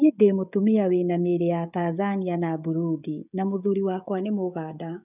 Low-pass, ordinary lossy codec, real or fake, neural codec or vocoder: 3.6 kHz; none; fake; codec, 16 kHz, 16 kbps, FreqCodec, smaller model